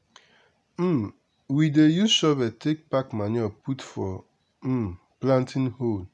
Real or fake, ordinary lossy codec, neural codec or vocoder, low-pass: real; none; none; none